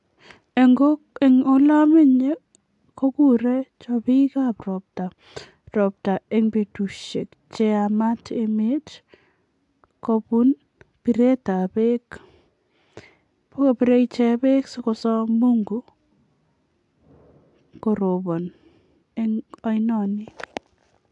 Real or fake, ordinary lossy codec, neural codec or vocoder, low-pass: real; AAC, 64 kbps; none; 10.8 kHz